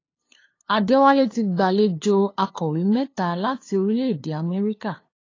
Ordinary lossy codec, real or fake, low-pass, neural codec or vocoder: AAC, 32 kbps; fake; 7.2 kHz; codec, 16 kHz, 2 kbps, FunCodec, trained on LibriTTS, 25 frames a second